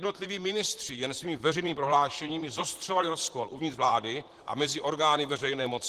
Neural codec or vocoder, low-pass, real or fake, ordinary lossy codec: vocoder, 44.1 kHz, 128 mel bands, Pupu-Vocoder; 14.4 kHz; fake; Opus, 16 kbps